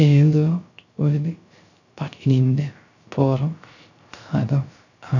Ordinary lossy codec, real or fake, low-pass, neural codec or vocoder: none; fake; 7.2 kHz; codec, 16 kHz, 0.3 kbps, FocalCodec